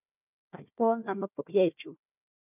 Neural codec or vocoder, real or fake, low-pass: codec, 16 kHz, 1 kbps, FunCodec, trained on Chinese and English, 50 frames a second; fake; 3.6 kHz